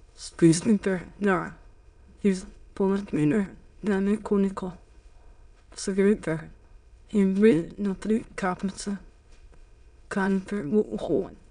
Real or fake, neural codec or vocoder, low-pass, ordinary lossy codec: fake; autoencoder, 22.05 kHz, a latent of 192 numbers a frame, VITS, trained on many speakers; 9.9 kHz; none